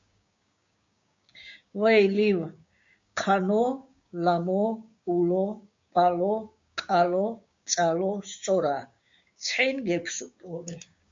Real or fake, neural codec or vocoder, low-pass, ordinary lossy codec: fake; codec, 16 kHz, 6 kbps, DAC; 7.2 kHz; MP3, 48 kbps